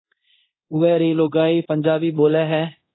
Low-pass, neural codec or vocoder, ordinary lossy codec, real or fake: 7.2 kHz; codec, 24 kHz, 0.9 kbps, DualCodec; AAC, 16 kbps; fake